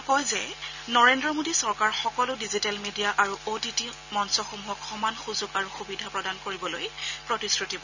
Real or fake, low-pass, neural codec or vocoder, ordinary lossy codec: real; 7.2 kHz; none; none